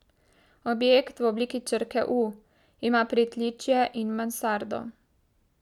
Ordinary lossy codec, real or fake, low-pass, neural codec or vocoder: none; real; 19.8 kHz; none